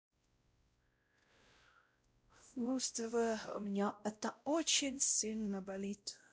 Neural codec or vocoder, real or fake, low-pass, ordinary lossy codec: codec, 16 kHz, 0.5 kbps, X-Codec, WavLM features, trained on Multilingual LibriSpeech; fake; none; none